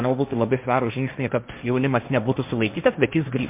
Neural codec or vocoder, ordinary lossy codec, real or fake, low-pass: codec, 16 kHz, 1.1 kbps, Voila-Tokenizer; MP3, 32 kbps; fake; 3.6 kHz